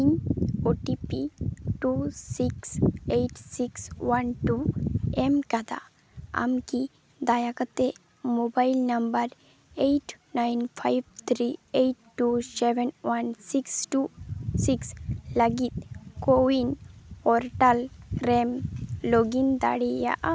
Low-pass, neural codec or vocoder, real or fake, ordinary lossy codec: none; none; real; none